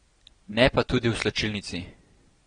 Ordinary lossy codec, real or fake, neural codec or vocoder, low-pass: AAC, 32 kbps; real; none; 9.9 kHz